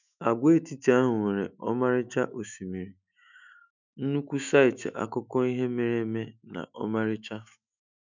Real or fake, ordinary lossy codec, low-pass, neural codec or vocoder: fake; none; 7.2 kHz; autoencoder, 48 kHz, 128 numbers a frame, DAC-VAE, trained on Japanese speech